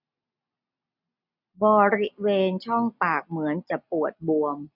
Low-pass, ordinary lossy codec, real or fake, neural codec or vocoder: 5.4 kHz; none; real; none